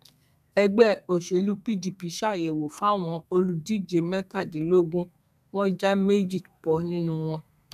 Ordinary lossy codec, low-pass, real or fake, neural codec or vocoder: none; 14.4 kHz; fake; codec, 32 kHz, 1.9 kbps, SNAC